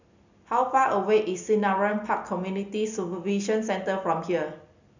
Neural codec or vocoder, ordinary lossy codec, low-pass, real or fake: none; none; 7.2 kHz; real